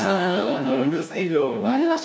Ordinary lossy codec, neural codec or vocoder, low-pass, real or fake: none; codec, 16 kHz, 1 kbps, FunCodec, trained on LibriTTS, 50 frames a second; none; fake